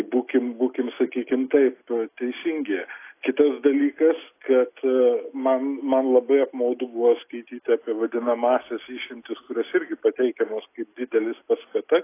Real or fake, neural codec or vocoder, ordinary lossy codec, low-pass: real; none; AAC, 24 kbps; 3.6 kHz